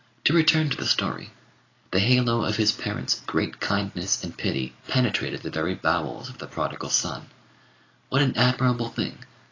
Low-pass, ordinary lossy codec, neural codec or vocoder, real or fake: 7.2 kHz; AAC, 32 kbps; none; real